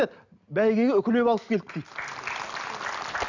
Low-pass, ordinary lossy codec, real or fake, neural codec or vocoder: 7.2 kHz; none; real; none